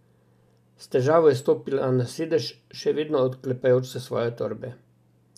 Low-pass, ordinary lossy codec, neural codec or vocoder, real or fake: 14.4 kHz; none; none; real